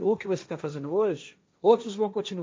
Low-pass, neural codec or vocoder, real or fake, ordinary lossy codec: none; codec, 16 kHz, 1.1 kbps, Voila-Tokenizer; fake; none